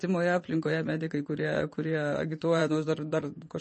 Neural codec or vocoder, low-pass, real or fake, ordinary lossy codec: vocoder, 22.05 kHz, 80 mel bands, WaveNeXt; 9.9 kHz; fake; MP3, 32 kbps